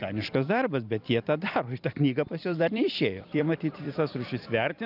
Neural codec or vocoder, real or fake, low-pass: vocoder, 44.1 kHz, 128 mel bands every 256 samples, BigVGAN v2; fake; 5.4 kHz